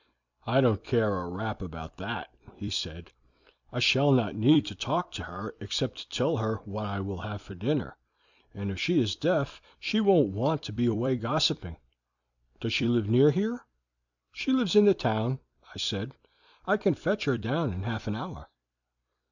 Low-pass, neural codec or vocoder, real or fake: 7.2 kHz; vocoder, 44.1 kHz, 128 mel bands every 256 samples, BigVGAN v2; fake